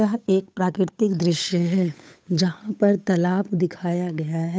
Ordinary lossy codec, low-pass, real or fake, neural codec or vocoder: none; none; fake; codec, 16 kHz, 8 kbps, FunCodec, trained on Chinese and English, 25 frames a second